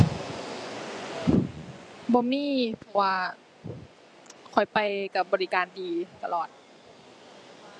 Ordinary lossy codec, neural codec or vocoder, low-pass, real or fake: none; vocoder, 24 kHz, 100 mel bands, Vocos; none; fake